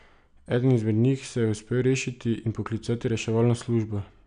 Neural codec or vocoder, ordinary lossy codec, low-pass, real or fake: none; none; 9.9 kHz; real